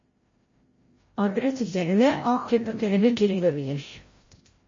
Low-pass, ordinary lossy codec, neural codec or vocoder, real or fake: 7.2 kHz; MP3, 32 kbps; codec, 16 kHz, 0.5 kbps, FreqCodec, larger model; fake